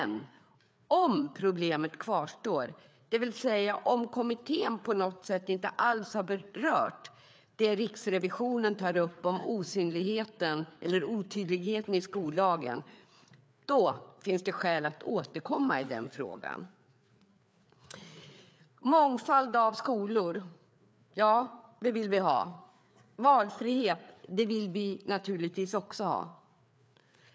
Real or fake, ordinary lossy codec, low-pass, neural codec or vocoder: fake; none; none; codec, 16 kHz, 4 kbps, FreqCodec, larger model